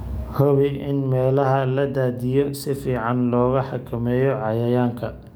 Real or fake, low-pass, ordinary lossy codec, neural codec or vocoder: fake; none; none; codec, 44.1 kHz, 7.8 kbps, DAC